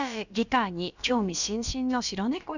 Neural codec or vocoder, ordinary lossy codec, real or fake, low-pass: codec, 16 kHz, about 1 kbps, DyCAST, with the encoder's durations; none; fake; 7.2 kHz